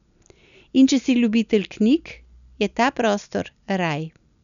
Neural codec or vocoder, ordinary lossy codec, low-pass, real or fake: none; none; 7.2 kHz; real